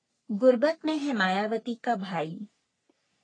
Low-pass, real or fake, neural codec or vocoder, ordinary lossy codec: 9.9 kHz; fake; codec, 44.1 kHz, 3.4 kbps, Pupu-Codec; AAC, 32 kbps